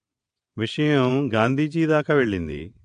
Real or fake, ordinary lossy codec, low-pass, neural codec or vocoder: fake; AAC, 64 kbps; 9.9 kHz; vocoder, 22.05 kHz, 80 mel bands, WaveNeXt